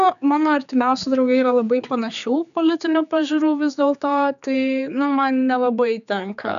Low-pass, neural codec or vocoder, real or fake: 7.2 kHz; codec, 16 kHz, 4 kbps, X-Codec, HuBERT features, trained on general audio; fake